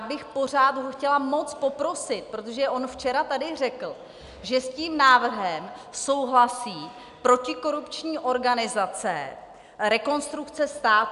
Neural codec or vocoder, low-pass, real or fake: none; 10.8 kHz; real